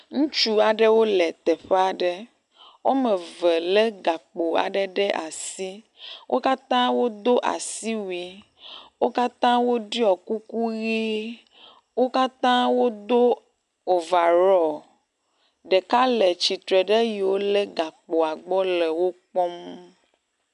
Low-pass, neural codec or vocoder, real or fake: 10.8 kHz; none; real